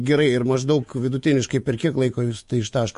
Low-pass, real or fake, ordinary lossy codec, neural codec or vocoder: 10.8 kHz; fake; MP3, 48 kbps; codec, 24 kHz, 3.1 kbps, DualCodec